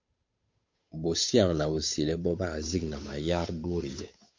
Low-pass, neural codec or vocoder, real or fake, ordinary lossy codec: 7.2 kHz; codec, 16 kHz, 8 kbps, FunCodec, trained on Chinese and English, 25 frames a second; fake; MP3, 48 kbps